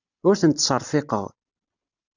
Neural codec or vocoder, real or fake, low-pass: none; real; 7.2 kHz